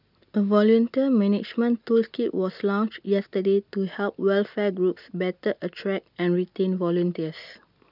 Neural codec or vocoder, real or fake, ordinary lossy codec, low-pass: none; real; none; 5.4 kHz